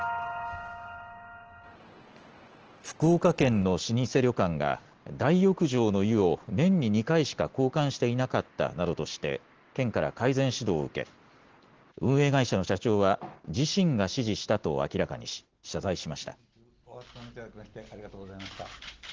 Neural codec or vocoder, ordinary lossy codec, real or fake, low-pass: none; Opus, 16 kbps; real; 7.2 kHz